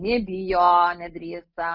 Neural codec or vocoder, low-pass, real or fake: none; 5.4 kHz; real